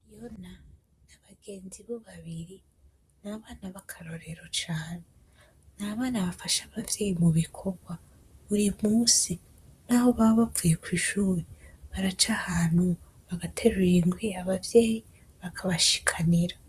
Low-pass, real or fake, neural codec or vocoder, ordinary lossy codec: 14.4 kHz; fake; vocoder, 44.1 kHz, 128 mel bands, Pupu-Vocoder; Opus, 64 kbps